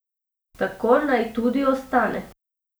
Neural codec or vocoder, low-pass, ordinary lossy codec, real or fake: none; none; none; real